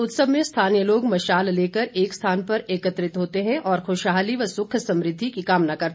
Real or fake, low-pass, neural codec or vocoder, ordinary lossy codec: real; none; none; none